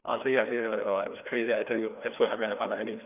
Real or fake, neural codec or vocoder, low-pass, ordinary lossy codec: fake; codec, 16 kHz, 2 kbps, FreqCodec, larger model; 3.6 kHz; none